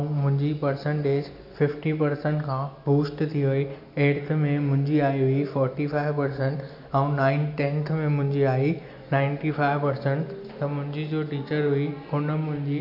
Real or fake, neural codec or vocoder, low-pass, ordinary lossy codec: real; none; 5.4 kHz; AAC, 48 kbps